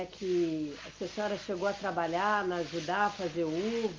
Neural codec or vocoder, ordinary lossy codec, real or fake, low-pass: none; Opus, 32 kbps; real; 7.2 kHz